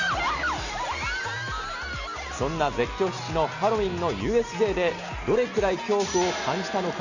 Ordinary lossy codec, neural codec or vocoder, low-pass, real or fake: none; none; 7.2 kHz; real